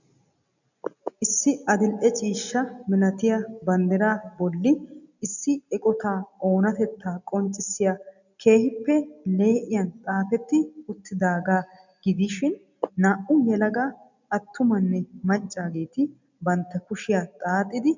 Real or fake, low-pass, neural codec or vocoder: real; 7.2 kHz; none